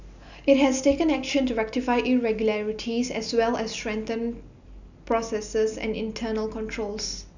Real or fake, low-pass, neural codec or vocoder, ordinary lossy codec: real; 7.2 kHz; none; none